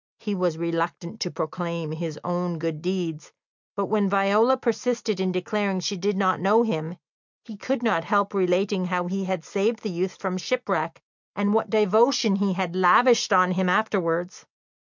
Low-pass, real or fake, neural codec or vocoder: 7.2 kHz; real; none